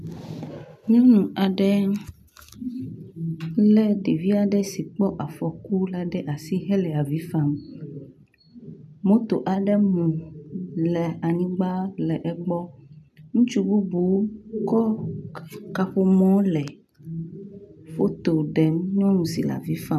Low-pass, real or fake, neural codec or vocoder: 14.4 kHz; real; none